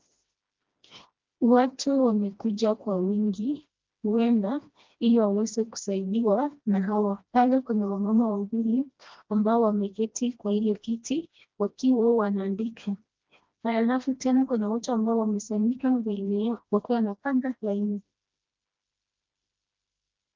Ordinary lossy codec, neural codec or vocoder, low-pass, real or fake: Opus, 24 kbps; codec, 16 kHz, 1 kbps, FreqCodec, smaller model; 7.2 kHz; fake